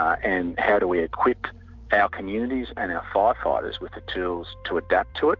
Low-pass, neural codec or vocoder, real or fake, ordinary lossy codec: 7.2 kHz; none; real; MP3, 64 kbps